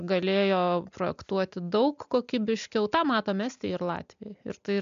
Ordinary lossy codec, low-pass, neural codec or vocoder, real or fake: MP3, 64 kbps; 7.2 kHz; none; real